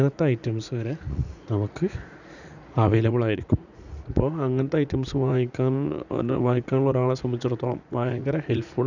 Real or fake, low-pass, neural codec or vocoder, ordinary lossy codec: fake; 7.2 kHz; vocoder, 44.1 kHz, 80 mel bands, Vocos; none